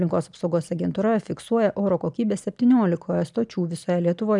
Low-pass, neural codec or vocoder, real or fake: 10.8 kHz; none; real